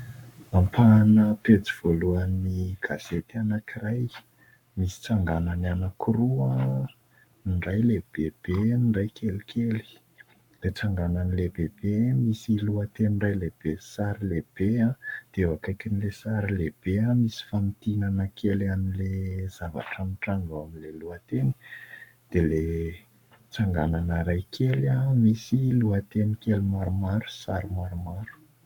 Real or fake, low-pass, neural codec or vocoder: fake; 19.8 kHz; codec, 44.1 kHz, 7.8 kbps, Pupu-Codec